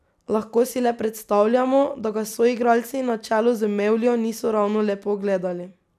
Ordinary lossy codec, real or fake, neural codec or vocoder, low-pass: none; fake; vocoder, 44.1 kHz, 128 mel bands every 256 samples, BigVGAN v2; 14.4 kHz